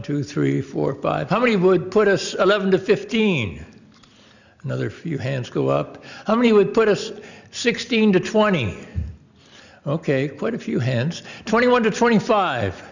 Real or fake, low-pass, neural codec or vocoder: real; 7.2 kHz; none